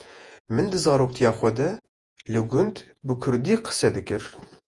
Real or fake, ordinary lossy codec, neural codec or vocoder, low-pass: fake; Opus, 32 kbps; vocoder, 48 kHz, 128 mel bands, Vocos; 10.8 kHz